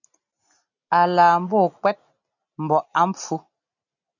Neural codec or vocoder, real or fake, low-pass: none; real; 7.2 kHz